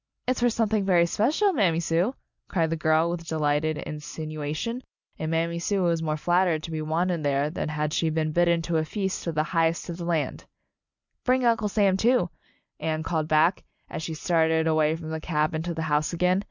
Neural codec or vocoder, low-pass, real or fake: none; 7.2 kHz; real